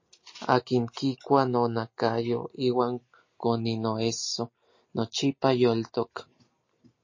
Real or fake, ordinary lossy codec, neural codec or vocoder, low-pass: real; MP3, 32 kbps; none; 7.2 kHz